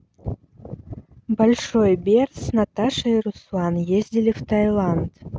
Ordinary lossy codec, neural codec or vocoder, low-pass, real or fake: none; none; none; real